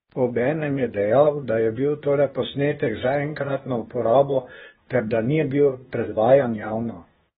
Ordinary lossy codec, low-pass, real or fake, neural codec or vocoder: AAC, 16 kbps; 7.2 kHz; fake; codec, 16 kHz, 0.8 kbps, ZipCodec